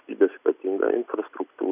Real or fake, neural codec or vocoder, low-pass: real; none; 3.6 kHz